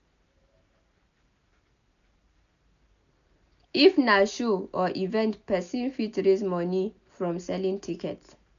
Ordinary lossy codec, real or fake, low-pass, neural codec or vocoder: none; real; 7.2 kHz; none